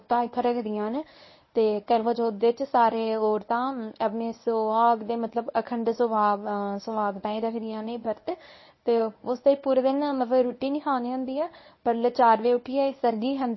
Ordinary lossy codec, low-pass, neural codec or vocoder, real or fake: MP3, 24 kbps; 7.2 kHz; codec, 24 kHz, 0.9 kbps, WavTokenizer, medium speech release version 1; fake